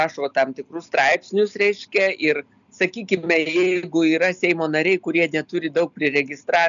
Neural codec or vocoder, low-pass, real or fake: none; 7.2 kHz; real